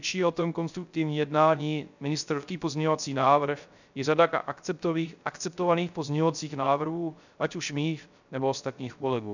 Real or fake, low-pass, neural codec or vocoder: fake; 7.2 kHz; codec, 16 kHz, 0.3 kbps, FocalCodec